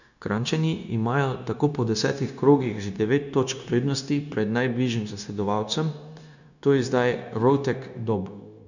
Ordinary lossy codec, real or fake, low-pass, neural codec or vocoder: none; fake; 7.2 kHz; codec, 16 kHz, 0.9 kbps, LongCat-Audio-Codec